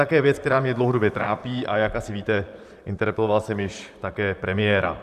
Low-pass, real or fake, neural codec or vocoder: 14.4 kHz; fake; vocoder, 44.1 kHz, 128 mel bands, Pupu-Vocoder